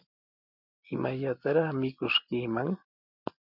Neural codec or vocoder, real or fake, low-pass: none; real; 5.4 kHz